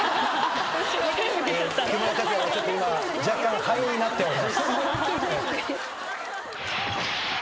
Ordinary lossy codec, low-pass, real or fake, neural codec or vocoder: none; none; real; none